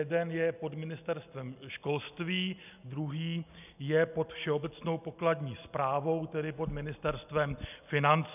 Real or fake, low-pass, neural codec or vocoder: real; 3.6 kHz; none